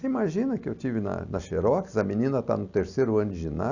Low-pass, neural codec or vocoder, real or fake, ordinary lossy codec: 7.2 kHz; none; real; Opus, 64 kbps